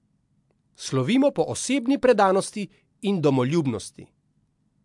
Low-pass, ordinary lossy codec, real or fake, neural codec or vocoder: 10.8 kHz; MP3, 64 kbps; real; none